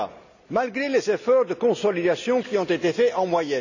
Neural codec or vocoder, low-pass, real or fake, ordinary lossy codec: none; 7.2 kHz; real; none